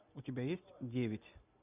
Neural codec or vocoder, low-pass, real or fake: none; 3.6 kHz; real